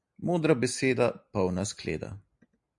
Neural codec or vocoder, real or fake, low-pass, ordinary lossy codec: none; real; 10.8 kHz; MP3, 96 kbps